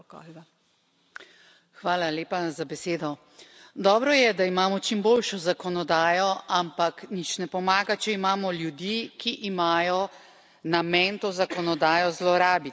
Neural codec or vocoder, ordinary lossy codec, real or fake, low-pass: none; none; real; none